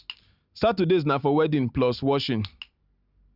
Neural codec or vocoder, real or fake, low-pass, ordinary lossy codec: none; real; 5.4 kHz; Opus, 64 kbps